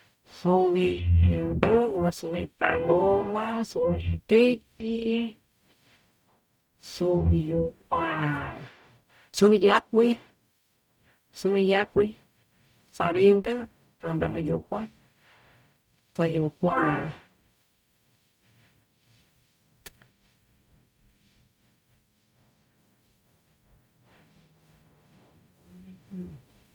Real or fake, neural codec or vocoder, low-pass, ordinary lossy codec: fake; codec, 44.1 kHz, 0.9 kbps, DAC; 19.8 kHz; MP3, 96 kbps